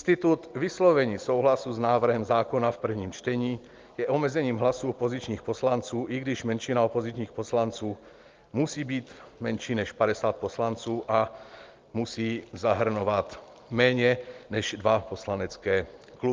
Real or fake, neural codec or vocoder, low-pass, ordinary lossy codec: real; none; 7.2 kHz; Opus, 32 kbps